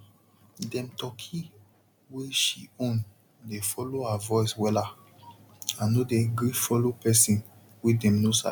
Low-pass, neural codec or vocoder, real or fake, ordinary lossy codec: 19.8 kHz; none; real; none